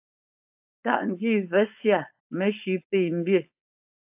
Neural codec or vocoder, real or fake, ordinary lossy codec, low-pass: codec, 16 kHz, 4.8 kbps, FACodec; fake; AAC, 32 kbps; 3.6 kHz